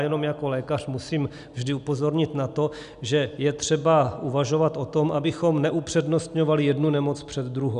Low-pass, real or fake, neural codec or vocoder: 10.8 kHz; real; none